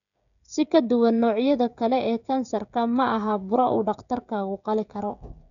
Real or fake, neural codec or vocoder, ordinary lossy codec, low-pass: fake; codec, 16 kHz, 16 kbps, FreqCodec, smaller model; none; 7.2 kHz